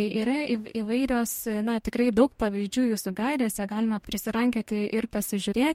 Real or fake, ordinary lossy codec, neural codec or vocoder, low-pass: fake; MP3, 64 kbps; codec, 44.1 kHz, 2.6 kbps, DAC; 19.8 kHz